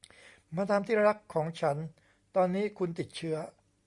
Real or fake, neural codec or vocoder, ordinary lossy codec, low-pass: real; none; Opus, 64 kbps; 10.8 kHz